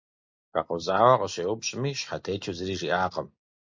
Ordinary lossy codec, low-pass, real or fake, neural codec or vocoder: MP3, 48 kbps; 7.2 kHz; real; none